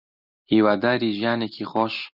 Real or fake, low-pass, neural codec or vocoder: real; 5.4 kHz; none